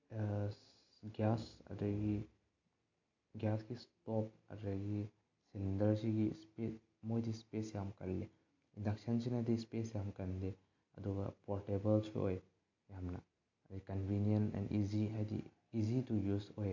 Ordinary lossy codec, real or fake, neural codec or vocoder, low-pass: AAC, 48 kbps; real; none; 7.2 kHz